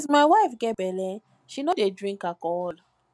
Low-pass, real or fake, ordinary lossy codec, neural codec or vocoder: none; real; none; none